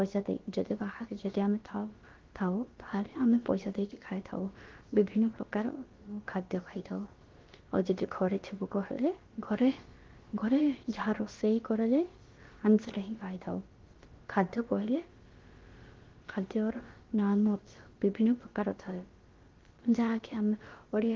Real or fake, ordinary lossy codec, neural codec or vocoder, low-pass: fake; Opus, 16 kbps; codec, 16 kHz, about 1 kbps, DyCAST, with the encoder's durations; 7.2 kHz